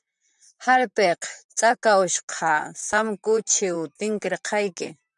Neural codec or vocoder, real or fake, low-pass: vocoder, 44.1 kHz, 128 mel bands, Pupu-Vocoder; fake; 10.8 kHz